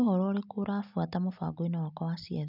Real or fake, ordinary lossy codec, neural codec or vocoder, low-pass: real; none; none; 5.4 kHz